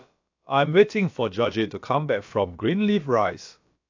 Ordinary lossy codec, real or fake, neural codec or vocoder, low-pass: AAC, 48 kbps; fake; codec, 16 kHz, about 1 kbps, DyCAST, with the encoder's durations; 7.2 kHz